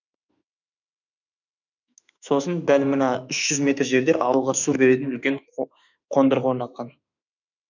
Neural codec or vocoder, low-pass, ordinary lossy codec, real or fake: autoencoder, 48 kHz, 32 numbers a frame, DAC-VAE, trained on Japanese speech; 7.2 kHz; none; fake